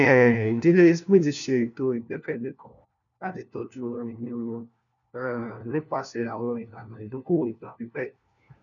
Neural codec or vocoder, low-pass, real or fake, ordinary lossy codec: codec, 16 kHz, 1 kbps, FunCodec, trained on LibriTTS, 50 frames a second; 7.2 kHz; fake; none